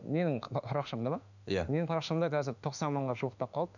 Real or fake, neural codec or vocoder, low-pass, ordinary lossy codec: fake; autoencoder, 48 kHz, 32 numbers a frame, DAC-VAE, trained on Japanese speech; 7.2 kHz; none